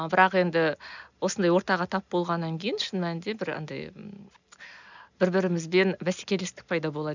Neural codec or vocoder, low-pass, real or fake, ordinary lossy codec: none; 7.2 kHz; real; none